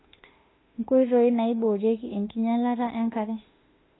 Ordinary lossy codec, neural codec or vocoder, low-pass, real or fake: AAC, 16 kbps; autoencoder, 48 kHz, 32 numbers a frame, DAC-VAE, trained on Japanese speech; 7.2 kHz; fake